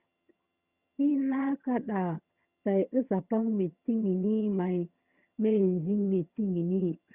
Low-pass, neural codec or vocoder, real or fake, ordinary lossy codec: 3.6 kHz; vocoder, 22.05 kHz, 80 mel bands, HiFi-GAN; fake; Opus, 64 kbps